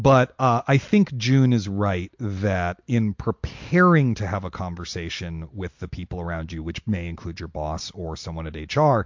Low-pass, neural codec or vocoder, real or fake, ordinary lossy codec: 7.2 kHz; none; real; MP3, 48 kbps